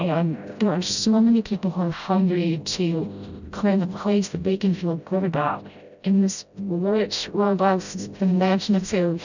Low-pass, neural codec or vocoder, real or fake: 7.2 kHz; codec, 16 kHz, 0.5 kbps, FreqCodec, smaller model; fake